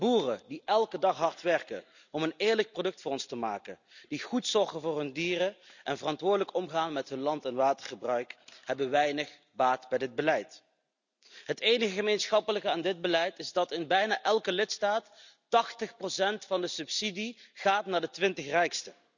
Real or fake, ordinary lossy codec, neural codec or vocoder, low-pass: real; none; none; 7.2 kHz